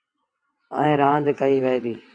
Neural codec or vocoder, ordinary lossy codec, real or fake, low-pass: vocoder, 22.05 kHz, 80 mel bands, WaveNeXt; AAC, 48 kbps; fake; 9.9 kHz